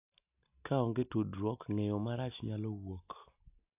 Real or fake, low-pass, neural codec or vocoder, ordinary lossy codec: real; 3.6 kHz; none; AAC, 32 kbps